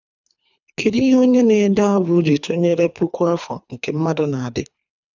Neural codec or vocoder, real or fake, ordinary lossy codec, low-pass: codec, 24 kHz, 3 kbps, HILCodec; fake; none; 7.2 kHz